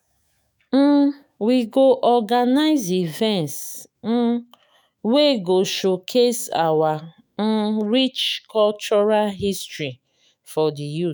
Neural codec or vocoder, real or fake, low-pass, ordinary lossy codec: autoencoder, 48 kHz, 128 numbers a frame, DAC-VAE, trained on Japanese speech; fake; none; none